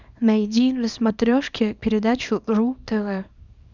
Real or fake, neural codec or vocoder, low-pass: fake; codec, 24 kHz, 0.9 kbps, WavTokenizer, small release; 7.2 kHz